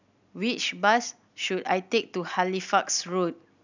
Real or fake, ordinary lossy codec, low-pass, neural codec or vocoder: real; none; 7.2 kHz; none